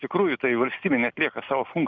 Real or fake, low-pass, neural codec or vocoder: real; 7.2 kHz; none